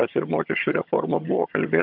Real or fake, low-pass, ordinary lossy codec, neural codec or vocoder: fake; 5.4 kHz; AAC, 48 kbps; vocoder, 22.05 kHz, 80 mel bands, HiFi-GAN